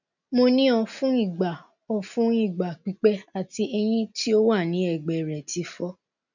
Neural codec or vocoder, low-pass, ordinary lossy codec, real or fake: none; 7.2 kHz; none; real